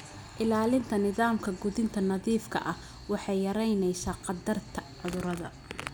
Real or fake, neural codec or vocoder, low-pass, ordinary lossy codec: real; none; none; none